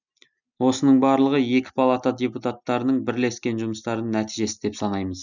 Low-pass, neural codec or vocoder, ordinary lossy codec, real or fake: 7.2 kHz; none; none; real